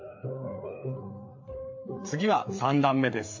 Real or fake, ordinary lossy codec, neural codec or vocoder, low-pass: fake; MP3, 32 kbps; codec, 16 kHz, 4 kbps, FreqCodec, larger model; 7.2 kHz